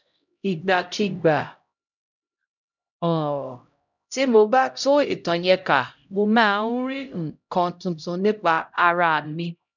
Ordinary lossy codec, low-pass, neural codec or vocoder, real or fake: none; 7.2 kHz; codec, 16 kHz, 0.5 kbps, X-Codec, HuBERT features, trained on LibriSpeech; fake